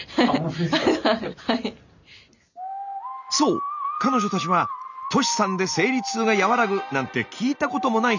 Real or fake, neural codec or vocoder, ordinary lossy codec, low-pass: real; none; none; 7.2 kHz